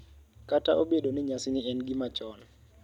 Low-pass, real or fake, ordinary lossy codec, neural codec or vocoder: 19.8 kHz; real; none; none